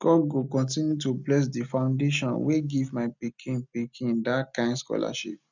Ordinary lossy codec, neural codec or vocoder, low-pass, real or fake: none; none; 7.2 kHz; real